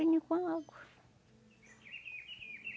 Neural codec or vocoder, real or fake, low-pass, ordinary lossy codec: none; real; none; none